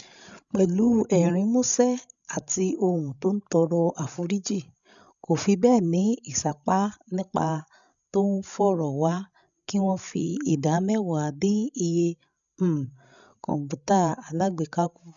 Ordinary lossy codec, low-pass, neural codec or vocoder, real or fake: none; 7.2 kHz; codec, 16 kHz, 16 kbps, FreqCodec, larger model; fake